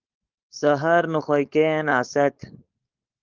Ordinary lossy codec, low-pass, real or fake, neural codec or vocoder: Opus, 32 kbps; 7.2 kHz; fake; codec, 16 kHz, 4.8 kbps, FACodec